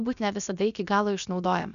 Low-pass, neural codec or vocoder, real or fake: 7.2 kHz; codec, 16 kHz, about 1 kbps, DyCAST, with the encoder's durations; fake